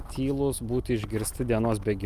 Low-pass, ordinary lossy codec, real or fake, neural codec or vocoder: 14.4 kHz; Opus, 32 kbps; real; none